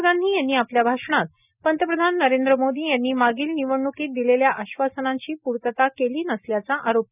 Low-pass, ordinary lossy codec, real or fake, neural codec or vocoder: 3.6 kHz; none; real; none